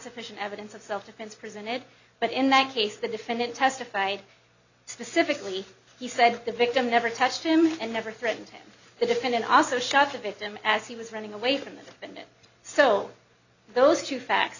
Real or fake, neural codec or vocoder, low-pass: real; none; 7.2 kHz